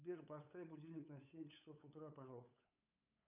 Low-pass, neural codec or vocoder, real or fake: 3.6 kHz; codec, 16 kHz, 8 kbps, FunCodec, trained on LibriTTS, 25 frames a second; fake